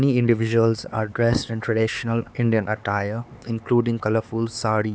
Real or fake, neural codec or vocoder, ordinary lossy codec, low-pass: fake; codec, 16 kHz, 4 kbps, X-Codec, HuBERT features, trained on LibriSpeech; none; none